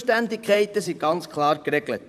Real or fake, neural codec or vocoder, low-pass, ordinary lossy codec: fake; vocoder, 44.1 kHz, 128 mel bands, Pupu-Vocoder; 14.4 kHz; none